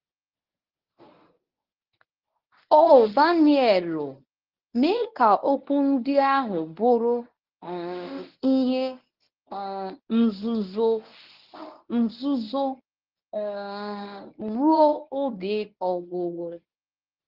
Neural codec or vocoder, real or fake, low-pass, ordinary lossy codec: codec, 24 kHz, 0.9 kbps, WavTokenizer, medium speech release version 1; fake; 5.4 kHz; Opus, 32 kbps